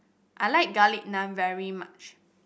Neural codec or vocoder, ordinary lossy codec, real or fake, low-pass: none; none; real; none